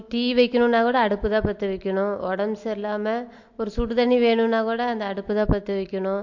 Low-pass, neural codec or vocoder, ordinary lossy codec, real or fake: 7.2 kHz; none; MP3, 48 kbps; real